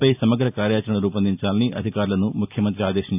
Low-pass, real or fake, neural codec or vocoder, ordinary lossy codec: 3.6 kHz; real; none; AAC, 32 kbps